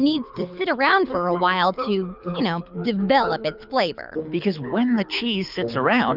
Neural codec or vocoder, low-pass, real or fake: codec, 24 kHz, 6 kbps, HILCodec; 5.4 kHz; fake